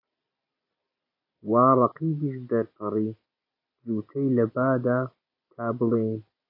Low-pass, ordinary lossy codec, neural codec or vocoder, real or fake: 5.4 kHz; AAC, 32 kbps; none; real